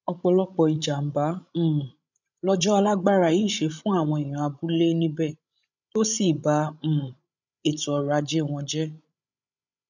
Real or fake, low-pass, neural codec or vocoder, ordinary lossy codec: fake; 7.2 kHz; codec, 16 kHz, 16 kbps, FreqCodec, larger model; none